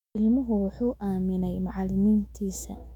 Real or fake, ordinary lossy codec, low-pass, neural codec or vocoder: fake; none; 19.8 kHz; autoencoder, 48 kHz, 128 numbers a frame, DAC-VAE, trained on Japanese speech